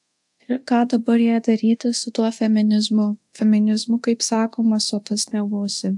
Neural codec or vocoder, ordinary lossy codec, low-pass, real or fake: codec, 24 kHz, 0.9 kbps, DualCodec; AAC, 64 kbps; 10.8 kHz; fake